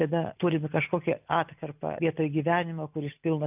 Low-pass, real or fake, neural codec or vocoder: 3.6 kHz; real; none